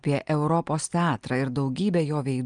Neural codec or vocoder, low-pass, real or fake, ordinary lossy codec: none; 9.9 kHz; real; Opus, 32 kbps